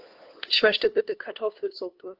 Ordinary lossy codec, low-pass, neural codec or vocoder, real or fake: Opus, 16 kbps; 5.4 kHz; codec, 16 kHz, 2 kbps, FunCodec, trained on LibriTTS, 25 frames a second; fake